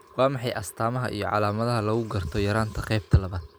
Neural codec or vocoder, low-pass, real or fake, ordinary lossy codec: none; none; real; none